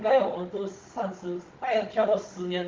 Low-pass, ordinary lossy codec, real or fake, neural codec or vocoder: 7.2 kHz; Opus, 32 kbps; fake; codec, 16 kHz, 16 kbps, FunCodec, trained on Chinese and English, 50 frames a second